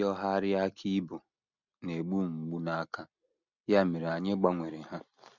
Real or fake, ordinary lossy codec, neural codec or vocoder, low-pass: real; none; none; 7.2 kHz